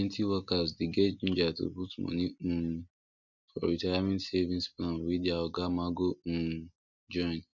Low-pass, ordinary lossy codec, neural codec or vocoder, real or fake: 7.2 kHz; none; none; real